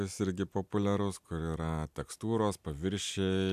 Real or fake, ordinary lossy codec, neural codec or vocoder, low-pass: real; AAC, 96 kbps; none; 14.4 kHz